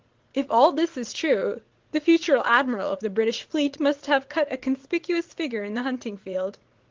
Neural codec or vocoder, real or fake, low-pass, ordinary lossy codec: vocoder, 44.1 kHz, 80 mel bands, Vocos; fake; 7.2 kHz; Opus, 16 kbps